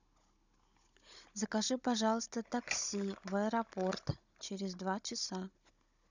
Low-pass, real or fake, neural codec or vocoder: 7.2 kHz; fake; codec, 16 kHz, 16 kbps, FunCodec, trained on Chinese and English, 50 frames a second